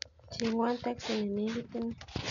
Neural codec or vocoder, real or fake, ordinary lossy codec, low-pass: none; real; MP3, 96 kbps; 7.2 kHz